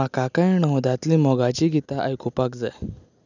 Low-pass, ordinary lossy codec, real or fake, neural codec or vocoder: 7.2 kHz; none; real; none